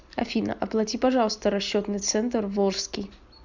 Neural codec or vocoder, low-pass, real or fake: none; 7.2 kHz; real